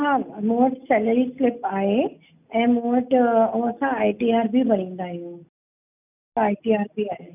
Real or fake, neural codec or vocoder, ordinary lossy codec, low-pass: real; none; none; 3.6 kHz